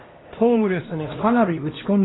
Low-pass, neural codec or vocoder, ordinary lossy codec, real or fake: 7.2 kHz; codec, 16 kHz, 1 kbps, X-Codec, HuBERT features, trained on LibriSpeech; AAC, 16 kbps; fake